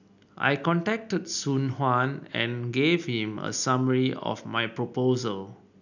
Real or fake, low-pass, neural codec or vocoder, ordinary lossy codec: real; 7.2 kHz; none; none